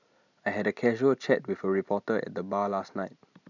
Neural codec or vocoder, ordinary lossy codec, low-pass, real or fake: none; none; 7.2 kHz; real